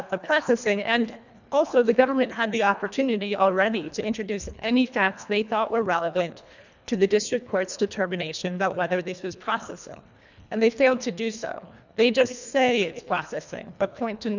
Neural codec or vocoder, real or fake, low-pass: codec, 24 kHz, 1.5 kbps, HILCodec; fake; 7.2 kHz